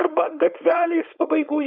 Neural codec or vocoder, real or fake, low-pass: codec, 16 kHz, 4.8 kbps, FACodec; fake; 5.4 kHz